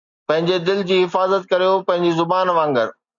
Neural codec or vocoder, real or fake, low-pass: none; real; 7.2 kHz